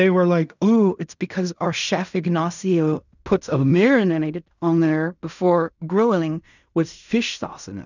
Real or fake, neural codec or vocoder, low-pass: fake; codec, 16 kHz in and 24 kHz out, 0.4 kbps, LongCat-Audio-Codec, fine tuned four codebook decoder; 7.2 kHz